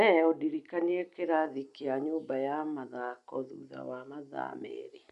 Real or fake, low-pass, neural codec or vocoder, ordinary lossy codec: real; 10.8 kHz; none; none